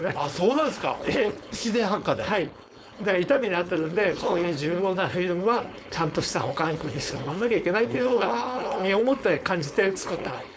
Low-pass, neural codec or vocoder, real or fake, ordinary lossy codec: none; codec, 16 kHz, 4.8 kbps, FACodec; fake; none